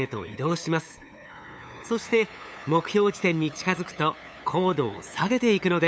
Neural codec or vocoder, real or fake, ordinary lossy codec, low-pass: codec, 16 kHz, 8 kbps, FunCodec, trained on LibriTTS, 25 frames a second; fake; none; none